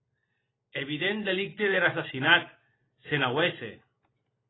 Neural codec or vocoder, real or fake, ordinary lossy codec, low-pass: none; real; AAC, 16 kbps; 7.2 kHz